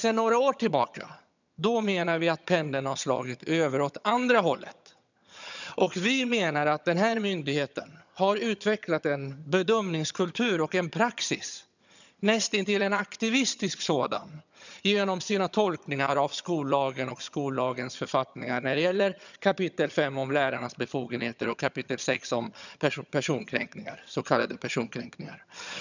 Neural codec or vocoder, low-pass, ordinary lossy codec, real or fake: vocoder, 22.05 kHz, 80 mel bands, HiFi-GAN; 7.2 kHz; none; fake